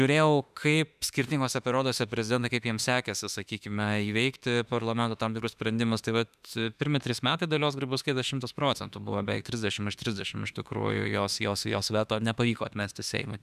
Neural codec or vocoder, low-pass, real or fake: autoencoder, 48 kHz, 32 numbers a frame, DAC-VAE, trained on Japanese speech; 14.4 kHz; fake